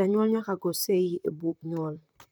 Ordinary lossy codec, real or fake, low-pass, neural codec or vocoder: none; fake; none; vocoder, 44.1 kHz, 128 mel bands, Pupu-Vocoder